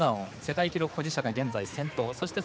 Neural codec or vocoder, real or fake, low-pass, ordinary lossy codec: codec, 16 kHz, 4 kbps, X-Codec, HuBERT features, trained on general audio; fake; none; none